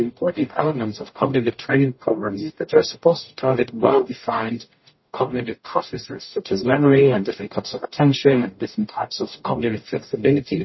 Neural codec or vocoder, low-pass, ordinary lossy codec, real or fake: codec, 44.1 kHz, 0.9 kbps, DAC; 7.2 kHz; MP3, 24 kbps; fake